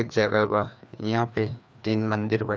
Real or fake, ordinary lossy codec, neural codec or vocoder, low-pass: fake; none; codec, 16 kHz, 2 kbps, FreqCodec, larger model; none